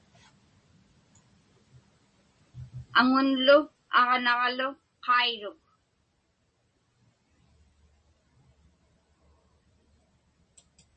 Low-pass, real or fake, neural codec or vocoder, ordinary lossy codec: 9.9 kHz; real; none; MP3, 32 kbps